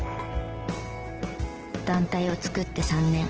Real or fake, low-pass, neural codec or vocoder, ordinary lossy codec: real; 7.2 kHz; none; Opus, 16 kbps